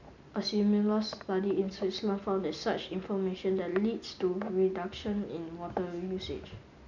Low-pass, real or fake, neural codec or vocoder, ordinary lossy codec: 7.2 kHz; real; none; none